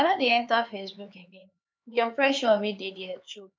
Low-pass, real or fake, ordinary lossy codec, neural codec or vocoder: none; fake; none; codec, 16 kHz, 4 kbps, X-Codec, HuBERT features, trained on LibriSpeech